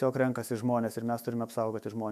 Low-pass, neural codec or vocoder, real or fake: 14.4 kHz; autoencoder, 48 kHz, 128 numbers a frame, DAC-VAE, trained on Japanese speech; fake